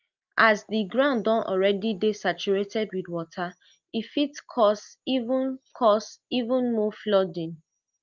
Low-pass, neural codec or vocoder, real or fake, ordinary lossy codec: 7.2 kHz; none; real; Opus, 24 kbps